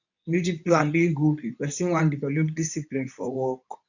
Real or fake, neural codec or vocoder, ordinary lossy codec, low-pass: fake; codec, 24 kHz, 0.9 kbps, WavTokenizer, medium speech release version 2; none; 7.2 kHz